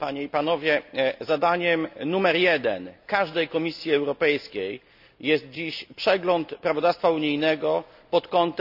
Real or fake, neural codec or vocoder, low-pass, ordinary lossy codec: real; none; 5.4 kHz; none